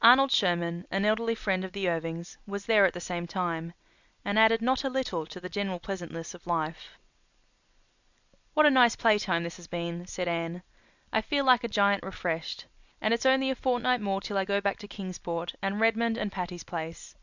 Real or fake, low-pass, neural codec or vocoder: real; 7.2 kHz; none